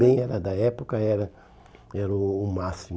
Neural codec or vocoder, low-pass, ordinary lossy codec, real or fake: none; none; none; real